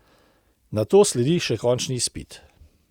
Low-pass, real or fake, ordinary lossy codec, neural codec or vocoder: 19.8 kHz; real; Opus, 64 kbps; none